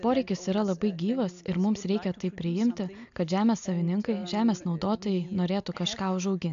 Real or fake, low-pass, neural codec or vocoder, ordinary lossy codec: real; 7.2 kHz; none; AAC, 64 kbps